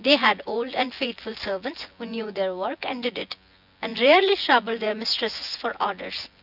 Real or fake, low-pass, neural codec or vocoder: fake; 5.4 kHz; vocoder, 24 kHz, 100 mel bands, Vocos